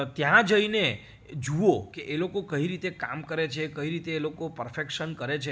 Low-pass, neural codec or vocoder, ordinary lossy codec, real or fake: none; none; none; real